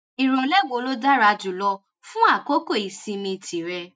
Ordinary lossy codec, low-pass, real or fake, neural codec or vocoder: none; none; real; none